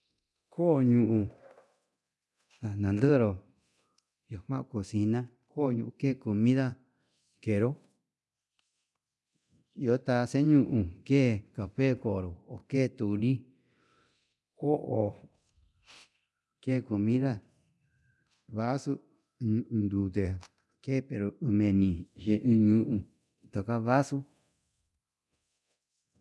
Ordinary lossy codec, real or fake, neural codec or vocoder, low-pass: none; fake; codec, 24 kHz, 0.9 kbps, DualCodec; none